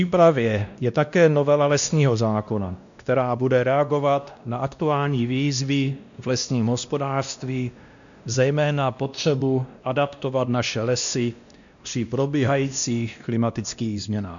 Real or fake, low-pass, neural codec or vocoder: fake; 7.2 kHz; codec, 16 kHz, 1 kbps, X-Codec, WavLM features, trained on Multilingual LibriSpeech